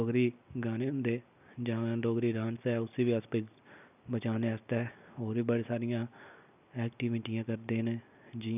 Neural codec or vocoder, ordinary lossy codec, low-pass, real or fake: none; none; 3.6 kHz; real